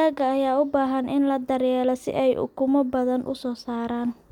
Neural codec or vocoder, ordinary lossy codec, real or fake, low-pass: none; none; real; 19.8 kHz